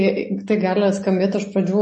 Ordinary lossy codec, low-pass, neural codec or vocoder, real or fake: MP3, 32 kbps; 10.8 kHz; none; real